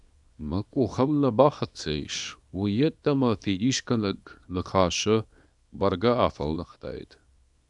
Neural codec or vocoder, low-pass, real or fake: codec, 24 kHz, 0.9 kbps, WavTokenizer, small release; 10.8 kHz; fake